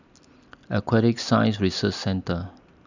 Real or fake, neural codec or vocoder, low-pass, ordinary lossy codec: real; none; 7.2 kHz; none